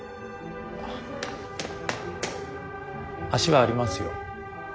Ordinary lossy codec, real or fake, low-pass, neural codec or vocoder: none; real; none; none